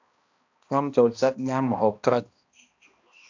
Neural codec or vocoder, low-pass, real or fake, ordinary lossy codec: codec, 16 kHz, 1 kbps, X-Codec, HuBERT features, trained on balanced general audio; 7.2 kHz; fake; AAC, 48 kbps